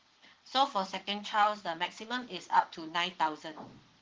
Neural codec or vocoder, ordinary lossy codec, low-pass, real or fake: vocoder, 22.05 kHz, 80 mel bands, Vocos; Opus, 16 kbps; 7.2 kHz; fake